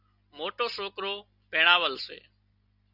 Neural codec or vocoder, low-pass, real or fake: none; 5.4 kHz; real